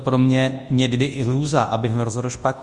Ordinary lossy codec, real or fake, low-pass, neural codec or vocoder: Opus, 32 kbps; fake; 10.8 kHz; codec, 24 kHz, 0.9 kbps, WavTokenizer, large speech release